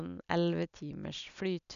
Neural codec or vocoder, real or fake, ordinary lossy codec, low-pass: none; real; none; 7.2 kHz